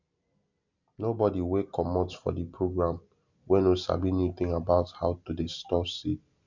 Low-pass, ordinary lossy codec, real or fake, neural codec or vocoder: 7.2 kHz; none; real; none